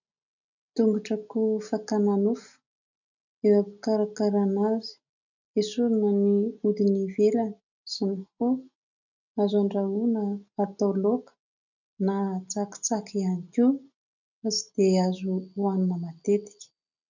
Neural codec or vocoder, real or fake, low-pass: none; real; 7.2 kHz